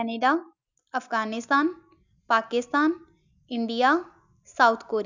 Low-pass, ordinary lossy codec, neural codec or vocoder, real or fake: 7.2 kHz; none; none; real